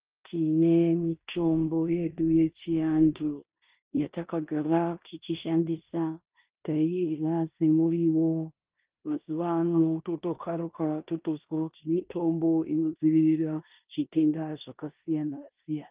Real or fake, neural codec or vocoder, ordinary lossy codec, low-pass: fake; codec, 16 kHz in and 24 kHz out, 0.9 kbps, LongCat-Audio-Codec, four codebook decoder; Opus, 24 kbps; 3.6 kHz